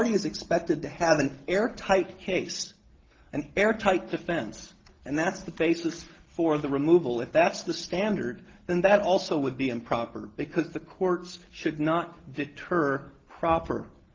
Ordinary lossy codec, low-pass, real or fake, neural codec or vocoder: Opus, 16 kbps; 7.2 kHz; real; none